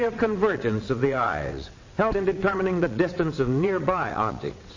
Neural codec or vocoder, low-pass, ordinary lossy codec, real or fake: vocoder, 22.05 kHz, 80 mel bands, WaveNeXt; 7.2 kHz; MP3, 32 kbps; fake